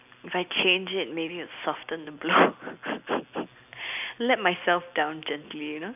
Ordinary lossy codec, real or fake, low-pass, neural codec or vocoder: none; real; 3.6 kHz; none